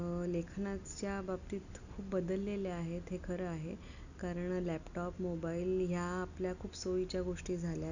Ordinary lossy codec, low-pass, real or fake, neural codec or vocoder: none; 7.2 kHz; real; none